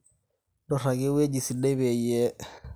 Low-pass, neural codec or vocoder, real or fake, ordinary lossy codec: none; none; real; none